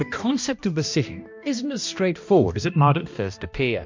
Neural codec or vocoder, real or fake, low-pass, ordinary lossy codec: codec, 16 kHz, 1 kbps, X-Codec, HuBERT features, trained on balanced general audio; fake; 7.2 kHz; MP3, 48 kbps